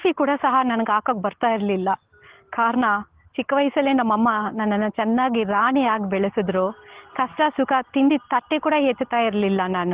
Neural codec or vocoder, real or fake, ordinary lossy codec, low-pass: none; real; Opus, 16 kbps; 3.6 kHz